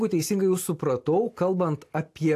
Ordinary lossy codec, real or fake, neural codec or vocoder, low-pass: AAC, 64 kbps; real; none; 14.4 kHz